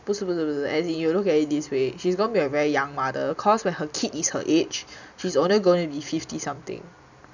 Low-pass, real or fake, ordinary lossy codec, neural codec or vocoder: 7.2 kHz; real; none; none